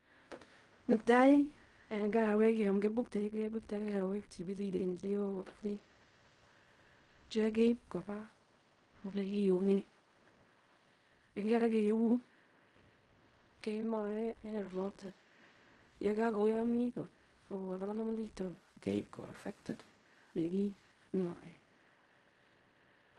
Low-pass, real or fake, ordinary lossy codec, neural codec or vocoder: 10.8 kHz; fake; Opus, 32 kbps; codec, 16 kHz in and 24 kHz out, 0.4 kbps, LongCat-Audio-Codec, fine tuned four codebook decoder